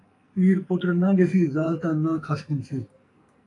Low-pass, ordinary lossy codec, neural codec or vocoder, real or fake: 10.8 kHz; AAC, 48 kbps; codec, 44.1 kHz, 2.6 kbps, SNAC; fake